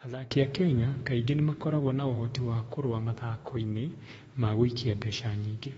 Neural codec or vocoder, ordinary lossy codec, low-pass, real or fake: autoencoder, 48 kHz, 32 numbers a frame, DAC-VAE, trained on Japanese speech; AAC, 24 kbps; 19.8 kHz; fake